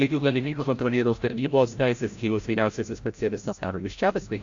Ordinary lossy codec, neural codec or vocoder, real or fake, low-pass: AAC, 64 kbps; codec, 16 kHz, 0.5 kbps, FreqCodec, larger model; fake; 7.2 kHz